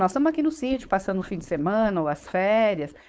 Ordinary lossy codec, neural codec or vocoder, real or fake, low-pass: none; codec, 16 kHz, 4.8 kbps, FACodec; fake; none